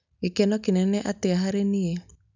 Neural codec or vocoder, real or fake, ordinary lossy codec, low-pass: none; real; none; 7.2 kHz